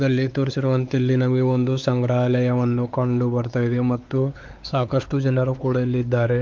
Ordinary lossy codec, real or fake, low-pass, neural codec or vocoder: Opus, 24 kbps; fake; 7.2 kHz; codec, 16 kHz, 4 kbps, X-Codec, WavLM features, trained on Multilingual LibriSpeech